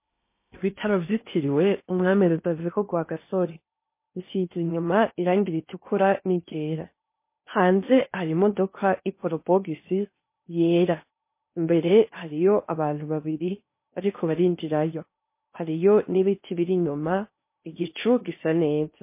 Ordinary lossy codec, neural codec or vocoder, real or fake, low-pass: MP3, 24 kbps; codec, 16 kHz in and 24 kHz out, 0.8 kbps, FocalCodec, streaming, 65536 codes; fake; 3.6 kHz